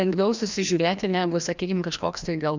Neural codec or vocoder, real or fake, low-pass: codec, 16 kHz, 1 kbps, FreqCodec, larger model; fake; 7.2 kHz